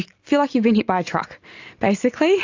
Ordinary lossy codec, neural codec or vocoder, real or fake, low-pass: AAC, 48 kbps; none; real; 7.2 kHz